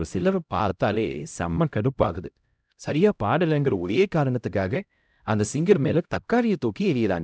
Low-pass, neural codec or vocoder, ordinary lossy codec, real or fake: none; codec, 16 kHz, 0.5 kbps, X-Codec, HuBERT features, trained on LibriSpeech; none; fake